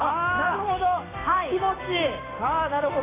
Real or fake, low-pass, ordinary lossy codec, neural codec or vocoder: fake; 3.6 kHz; AAC, 16 kbps; codec, 16 kHz, 6 kbps, DAC